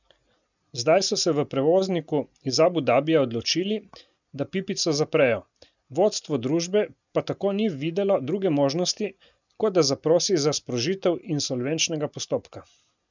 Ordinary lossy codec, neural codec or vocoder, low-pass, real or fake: none; none; 7.2 kHz; real